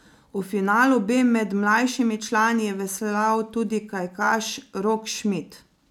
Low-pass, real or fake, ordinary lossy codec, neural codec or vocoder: 19.8 kHz; real; none; none